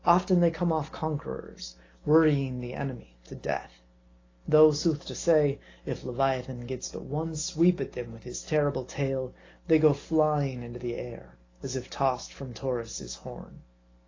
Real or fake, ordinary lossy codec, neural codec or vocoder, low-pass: real; AAC, 32 kbps; none; 7.2 kHz